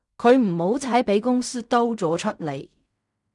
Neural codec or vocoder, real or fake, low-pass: codec, 16 kHz in and 24 kHz out, 0.4 kbps, LongCat-Audio-Codec, fine tuned four codebook decoder; fake; 10.8 kHz